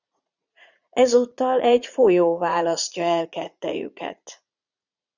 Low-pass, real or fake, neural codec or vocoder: 7.2 kHz; fake; vocoder, 44.1 kHz, 80 mel bands, Vocos